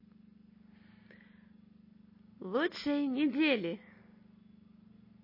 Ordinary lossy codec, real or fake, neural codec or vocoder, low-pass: MP3, 24 kbps; real; none; 5.4 kHz